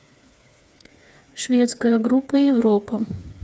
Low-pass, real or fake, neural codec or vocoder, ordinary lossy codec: none; fake; codec, 16 kHz, 4 kbps, FreqCodec, smaller model; none